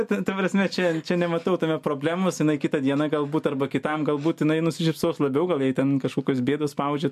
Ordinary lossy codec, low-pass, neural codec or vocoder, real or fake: MP3, 64 kbps; 14.4 kHz; none; real